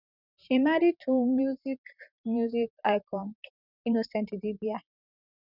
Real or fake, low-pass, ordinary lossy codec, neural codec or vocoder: fake; 5.4 kHz; none; vocoder, 44.1 kHz, 128 mel bands, Pupu-Vocoder